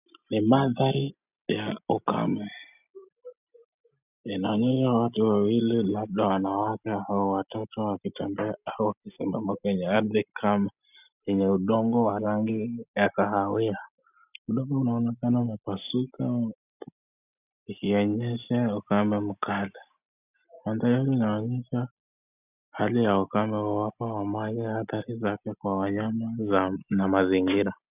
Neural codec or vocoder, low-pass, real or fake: none; 3.6 kHz; real